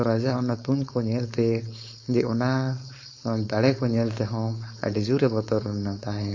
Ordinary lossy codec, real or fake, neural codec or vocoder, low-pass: MP3, 48 kbps; fake; codec, 16 kHz, 4.8 kbps, FACodec; 7.2 kHz